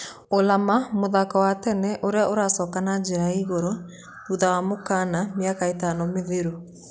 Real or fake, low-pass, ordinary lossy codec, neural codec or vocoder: real; none; none; none